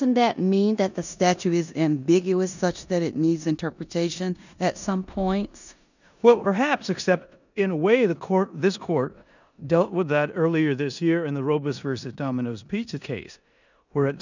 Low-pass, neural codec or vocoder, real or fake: 7.2 kHz; codec, 16 kHz in and 24 kHz out, 0.9 kbps, LongCat-Audio-Codec, four codebook decoder; fake